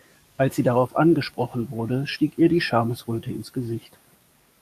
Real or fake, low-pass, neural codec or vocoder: fake; 14.4 kHz; codec, 44.1 kHz, 7.8 kbps, DAC